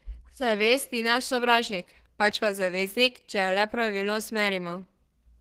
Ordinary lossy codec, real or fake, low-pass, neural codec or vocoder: Opus, 16 kbps; fake; 14.4 kHz; codec, 32 kHz, 1.9 kbps, SNAC